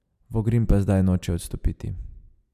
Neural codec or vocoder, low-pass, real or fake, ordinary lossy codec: none; 14.4 kHz; real; MP3, 96 kbps